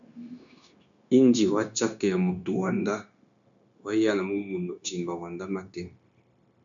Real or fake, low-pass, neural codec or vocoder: fake; 7.2 kHz; codec, 16 kHz, 0.9 kbps, LongCat-Audio-Codec